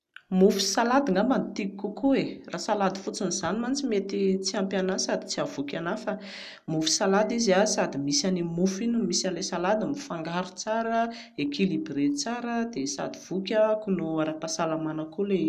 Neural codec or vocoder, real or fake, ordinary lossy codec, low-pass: none; real; none; 14.4 kHz